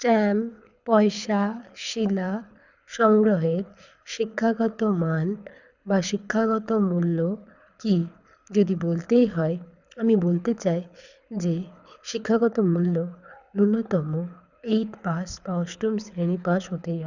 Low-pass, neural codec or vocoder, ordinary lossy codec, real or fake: 7.2 kHz; codec, 24 kHz, 6 kbps, HILCodec; none; fake